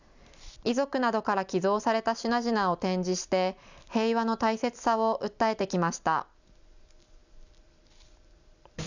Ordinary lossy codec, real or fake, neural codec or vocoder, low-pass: none; fake; vocoder, 44.1 kHz, 128 mel bands every 256 samples, BigVGAN v2; 7.2 kHz